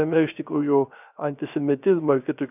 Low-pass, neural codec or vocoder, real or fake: 3.6 kHz; codec, 16 kHz, 0.3 kbps, FocalCodec; fake